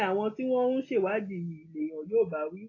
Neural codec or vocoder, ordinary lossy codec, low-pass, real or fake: none; AAC, 32 kbps; 7.2 kHz; real